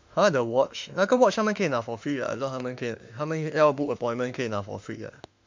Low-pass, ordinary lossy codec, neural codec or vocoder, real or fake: 7.2 kHz; MP3, 64 kbps; autoencoder, 48 kHz, 32 numbers a frame, DAC-VAE, trained on Japanese speech; fake